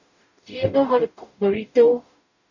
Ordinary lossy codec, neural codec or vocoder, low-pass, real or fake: none; codec, 44.1 kHz, 0.9 kbps, DAC; 7.2 kHz; fake